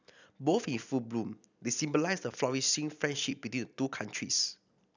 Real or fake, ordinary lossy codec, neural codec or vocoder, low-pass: real; none; none; 7.2 kHz